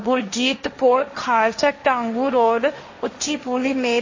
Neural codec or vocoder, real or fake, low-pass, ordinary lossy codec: codec, 16 kHz, 1.1 kbps, Voila-Tokenizer; fake; 7.2 kHz; MP3, 32 kbps